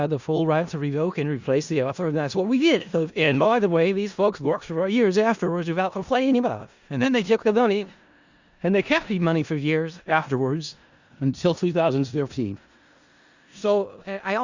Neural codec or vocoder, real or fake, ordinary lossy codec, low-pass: codec, 16 kHz in and 24 kHz out, 0.4 kbps, LongCat-Audio-Codec, four codebook decoder; fake; Opus, 64 kbps; 7.2 kHz